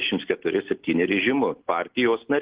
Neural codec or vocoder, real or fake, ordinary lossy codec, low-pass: none; real; Opus, 16 kbps; 3.6 kHz